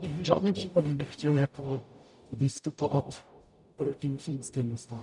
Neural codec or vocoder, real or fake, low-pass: codec, 44.1 kHz, 0.9 kbps, DAC; fake; 10.8 kHz